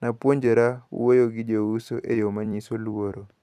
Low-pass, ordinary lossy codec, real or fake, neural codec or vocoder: 14.4 kHz; none; fake; vocoder, 44.1 kHz, 128 mel bands every 256 samples, BigVGAN v2